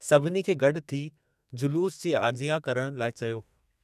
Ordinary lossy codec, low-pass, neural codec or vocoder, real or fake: none; 14.4 kHz; codec, 32 kHz, 1.9 kbps, SNAC; fake